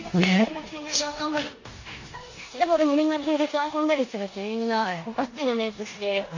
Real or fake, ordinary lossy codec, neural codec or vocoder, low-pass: fake; AAC, 48 kbps; codec, 16 kHz in and 24 kHz out, 0.9 kbps, LongCat-Audio-Codec, four codebook decoder; 7.2 kHz